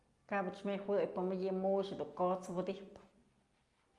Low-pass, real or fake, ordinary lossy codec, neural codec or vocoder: 10.8 kHz; real; Opus, 32 kbps; none